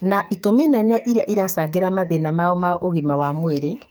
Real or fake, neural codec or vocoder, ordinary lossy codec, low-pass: fake; codec, 44.1 kHz, 2.6 kbps, SNAC; none; none